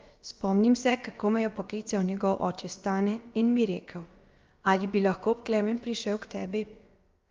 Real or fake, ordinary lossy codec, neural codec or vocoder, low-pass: fake; Opus, 24 kbps; codec, 16 kHz, about 1 kbps, DyCAST, with the encoder's durations; 7.2 kHz